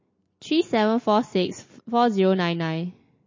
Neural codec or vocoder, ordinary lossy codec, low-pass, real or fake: none; MP3, 32 kbps; 7.2 kHz; real